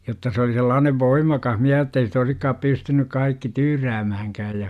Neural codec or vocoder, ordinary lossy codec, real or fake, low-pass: none; none; real; 14.4 kHz